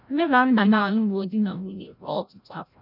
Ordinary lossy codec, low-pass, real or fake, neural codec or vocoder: none; 5.4 kHz; fake; codec, 16 kHz, 0.5 kbps, FreqCodec, larger model